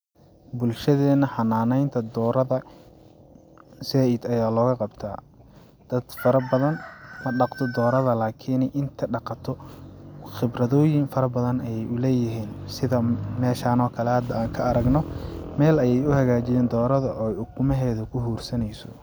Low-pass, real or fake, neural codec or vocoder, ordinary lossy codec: none; real; none; none